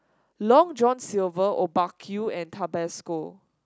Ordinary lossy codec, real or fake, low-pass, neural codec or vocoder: none; real; none; none